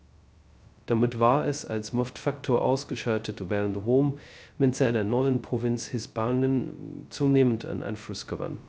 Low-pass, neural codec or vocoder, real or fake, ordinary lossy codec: none; codec, 16 kHz, 0.2 kbps, FocalCodec; fake; none